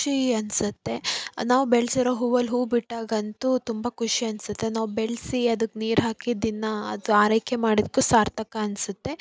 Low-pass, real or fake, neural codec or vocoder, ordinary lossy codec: none; real; none; none